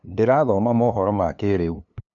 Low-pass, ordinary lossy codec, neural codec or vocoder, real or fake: 7.2 kHz; none; codec, 16 kHz, 2 kbps, FunCodec, trained on LibriTTS, 25 frames a second; fake